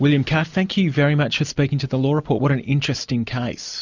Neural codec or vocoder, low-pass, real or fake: none; 7.2 kHz; real